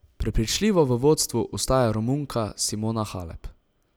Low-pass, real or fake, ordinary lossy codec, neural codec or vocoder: none; real; none; none